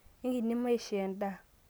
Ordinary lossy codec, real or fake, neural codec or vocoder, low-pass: none; real; none; none